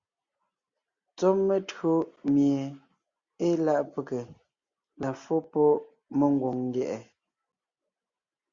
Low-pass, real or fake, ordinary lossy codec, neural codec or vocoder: 7.2 kHz; real; Opus, 64 kbps; none